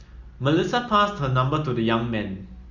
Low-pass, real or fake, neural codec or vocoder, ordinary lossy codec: 7.2 kHz; real; none; Opus, 64 kbps